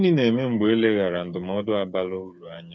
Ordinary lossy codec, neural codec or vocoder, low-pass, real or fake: none; codec, 16 kHz, 8 kbps, FreqCodec, smaller model; none; fake